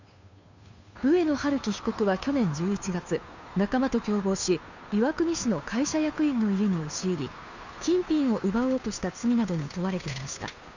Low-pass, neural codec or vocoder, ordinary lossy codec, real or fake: 7.2 kHz; codec, 16 kHz, 2 kbps, FunCodec, trained on Chinese and English, 25 frames a second; none; fake